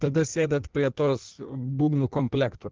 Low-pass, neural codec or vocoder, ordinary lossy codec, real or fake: 7.2 kHz; codec, 16 kHz in and 24 kHz out, 1.1 kbps, FireRedTTS-2 codec; Opus, 16 kbps; fake